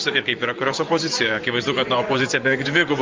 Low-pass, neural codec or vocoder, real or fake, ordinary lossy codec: 7.2 kHz; vocoder, 24 kHz, 100 mel bands, Vocos; fake; Opus, 32 kbps